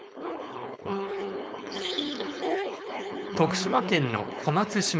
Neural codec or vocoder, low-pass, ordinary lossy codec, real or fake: codec, 16 kHz, 4.8 kbps, FACodec; none; none; fake